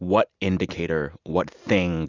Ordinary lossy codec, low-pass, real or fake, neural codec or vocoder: Opus, 64 kbps; 7.2 kHz; real; none